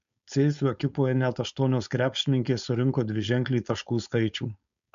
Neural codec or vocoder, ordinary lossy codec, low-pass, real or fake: codec, 16 kHz, 4.8 kbps, FACodec; MP3, 64 kbps; 7.2 kHz; fake